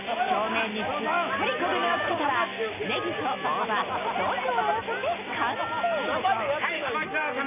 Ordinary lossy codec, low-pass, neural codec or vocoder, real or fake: none; 3.6 kHz; none; real